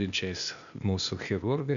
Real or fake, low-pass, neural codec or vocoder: fake; 7.2 kHz; codec, 16 kHz, 0.8 kbps, ZipCodec